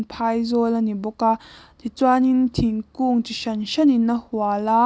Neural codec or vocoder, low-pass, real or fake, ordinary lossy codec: none; none; real; none